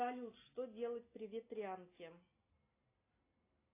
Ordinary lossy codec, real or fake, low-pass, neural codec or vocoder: AAC, 24 kbps; real; 3.6 kHz; none